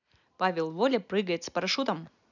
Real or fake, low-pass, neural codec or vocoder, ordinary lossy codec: real; 7.2 kHz; none; none